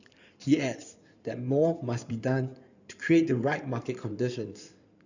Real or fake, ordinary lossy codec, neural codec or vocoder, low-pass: fake; none; codec, 16 kHz in and 24 kHz out, 2.2 kbps, FireRedTTS-2 codec; 7.2 kHz